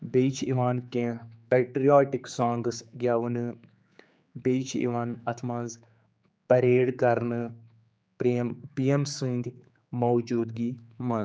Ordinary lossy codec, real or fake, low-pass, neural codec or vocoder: none; fake; none; codec, 16 kHz, 4 kbps, X-Codec, HuBERT features, trained on general audio